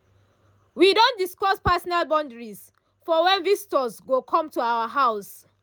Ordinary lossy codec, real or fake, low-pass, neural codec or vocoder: none; real; none; none